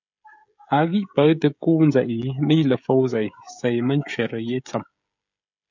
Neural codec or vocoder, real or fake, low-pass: codec, 16 kHz, 16 kbps, FreqCodec, smaller model; fake; 7.2 kHz